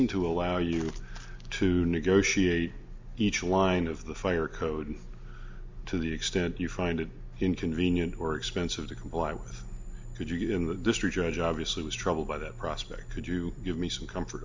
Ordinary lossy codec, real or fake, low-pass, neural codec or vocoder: MP3, 64 kbps; real; 7.2 kHz; none